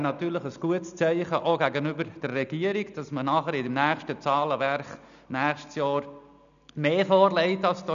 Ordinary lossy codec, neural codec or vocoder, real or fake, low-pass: none; none; real; 7.2 kHz